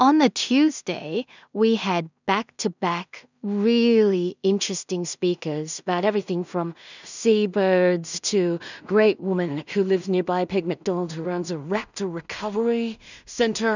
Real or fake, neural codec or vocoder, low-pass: fake; codec, 16 kHz in and 24 kHz out, 0.4 kbps, LongCat-Audio-Codec, two codebook decoder; 7.2 kHz